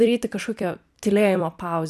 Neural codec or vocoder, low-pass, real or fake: vocoder, 44.1 kHz, 128 mel bands every 256 samples, BigVGAN v2; 14.4 kHz; fake